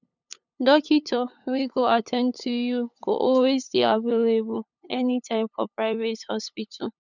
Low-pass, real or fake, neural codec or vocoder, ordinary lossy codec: 7.2 kHz; fake; codec, 16 kHz, 8 kbps, FunCodec, trained on LibriTTS, 25 frames a second; none